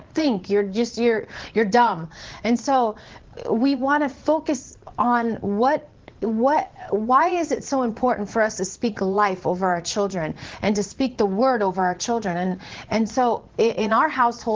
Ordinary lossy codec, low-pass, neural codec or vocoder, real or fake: Opus, 16 kbps; 7.2 kHz; vocoder, 22.05 kHz, 80 mel bands, Vocos; fake